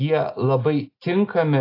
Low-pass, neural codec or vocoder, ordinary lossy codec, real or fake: 5.4 kHz; none; AAC, 32 kbps; real